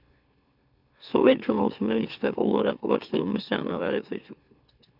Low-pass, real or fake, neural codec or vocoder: 5.4 kHz; fake; autoencoder, 44.1 kHz, a latent of 192 numbers a frame, MeloTTS